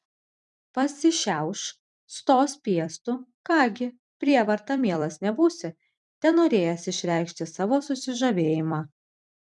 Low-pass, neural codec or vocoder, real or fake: 10.8 kHz; vocoder, 44.1 kHz, 128 mel bands every 256 samples, BigVGAN v2; fake